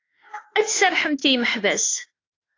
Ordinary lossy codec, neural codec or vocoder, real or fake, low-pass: AAC, 32 kbps; autoencoder, 48 kHz, 32 numbers a frame, DAC-VAE, trained on Japanese speech; fake; 7.2 kHz